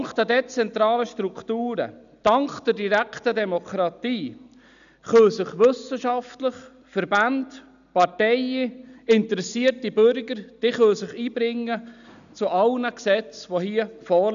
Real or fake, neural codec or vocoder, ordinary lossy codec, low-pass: real; none; none; 7.2 kHz